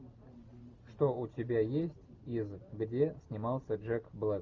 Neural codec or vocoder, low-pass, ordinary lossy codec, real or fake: none; 7.2 kHz; Opus, 32 kbps; real